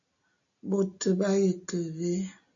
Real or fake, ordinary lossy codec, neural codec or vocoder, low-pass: real; AAC, 48 kbps; none; 7.2 kHz